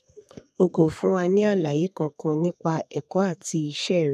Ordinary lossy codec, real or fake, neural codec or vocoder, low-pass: none; fake; codec, 32 kHz, 1.9 kbps, SNAC; 14.4 kHz